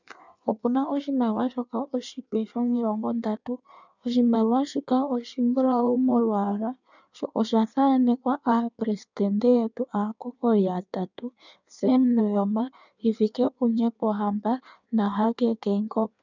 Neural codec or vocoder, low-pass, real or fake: codec, 16 kHz in and 24 kHz out, 1.1 kbps, FireRedTTS-2 codec; 7.2 kHz; fake